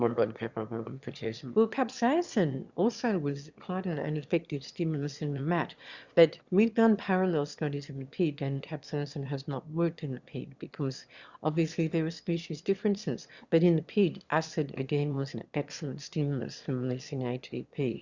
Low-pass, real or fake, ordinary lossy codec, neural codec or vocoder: 7.2 kHz; fake; Opus, 64 kbps; autoencoder, 22.05 kHz, a latent of 192 numbers a frame, VITS, trained on one speaker